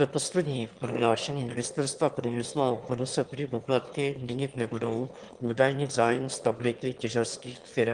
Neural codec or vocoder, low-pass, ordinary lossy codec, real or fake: autoencoder, 22.05 kHz, a latent of 192 numbers a frame, VITS, trained on one speaker; 9.9 kHz; Opus, 24 kbps; fake